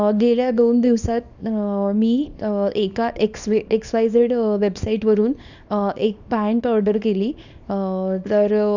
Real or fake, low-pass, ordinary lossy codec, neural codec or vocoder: fake; 7.2 kHz; none; codec, 24 kHz, 0.9 kbps, WavTokenizer, small release